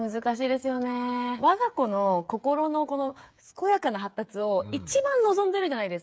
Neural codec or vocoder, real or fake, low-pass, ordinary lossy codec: codec, 16 kHz, 8 kbps, FreqCodec, smaller model; fake; none; none